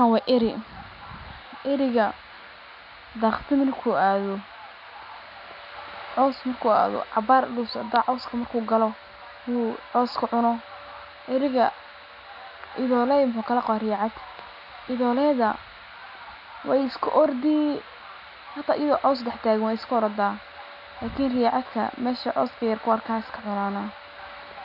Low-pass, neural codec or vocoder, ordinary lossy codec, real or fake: 5.4 kHz; none; none; real